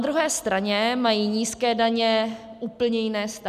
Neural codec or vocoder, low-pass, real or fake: none; 14.4 kHz; real